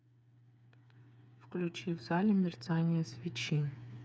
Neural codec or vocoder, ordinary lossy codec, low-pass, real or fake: codec, 16 kHz, 4 kbps, FreqCodec, smaller model; none; none; fake